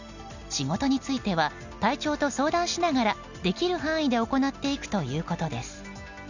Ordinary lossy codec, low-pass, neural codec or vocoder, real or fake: none; 7.2 kHz; none; real